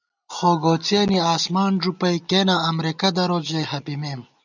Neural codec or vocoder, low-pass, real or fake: none; 7.2 kHz; real